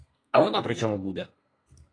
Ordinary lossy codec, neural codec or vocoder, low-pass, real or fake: AAC, 32 kbps; codec, 32 kHz, 1.9 kbps, SNAC; 9.9 kHz; fake